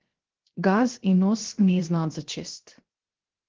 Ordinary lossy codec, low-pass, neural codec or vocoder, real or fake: Opus, 16 kbps; 7.2 kHz; codec, 16 kHz, 0.7 kbps, FocalCodec; fake